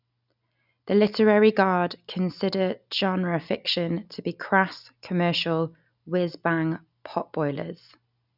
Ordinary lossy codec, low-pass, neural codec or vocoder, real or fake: none; 5.4 kHz; none; real